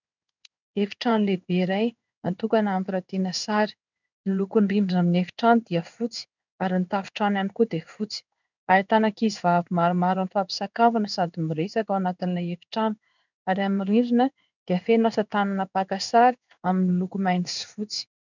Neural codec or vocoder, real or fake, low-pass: codec, 16 kHz in and 24 kHz out, 1 kbps, XY-Tokenizer; fake; 7.2 kHz